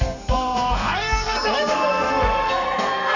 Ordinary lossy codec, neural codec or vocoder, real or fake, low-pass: none; codec, 32 kHz, 1.9 kbps, SNAC; fake; 7.2 kHz